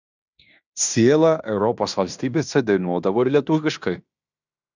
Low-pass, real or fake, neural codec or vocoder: 7.2 kHz; fake; codec, 16 kHz in and 24 kHz out, 0.9 kbps, LongCat-Audio-Codec, fine tuned four codebook decoder